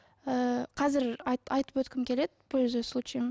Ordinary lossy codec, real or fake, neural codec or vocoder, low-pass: none; real; none; none